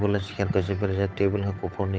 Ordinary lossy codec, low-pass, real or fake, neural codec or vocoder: none; none; fake; codec, 16 kHz, 8 kbps, FunCodec, trained on Chinese and English, 25 frames a second